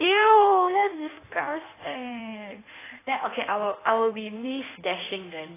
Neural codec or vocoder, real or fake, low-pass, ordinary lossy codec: codec, 16 kHz in and 24 kHz out, 1.1 kbps, FireRedTTS-2 codec; fake; 3.6 kHz; AAC, 16 kbps